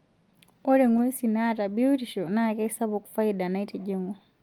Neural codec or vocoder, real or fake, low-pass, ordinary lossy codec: none; real; 19.8 kHz; Opus, 32 kbps